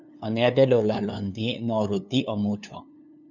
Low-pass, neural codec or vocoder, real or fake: 7.2 kHz; codec, 16 kHz, 2 kbps, FunCodec, trained on LibriTTS, 25 frames a second; fake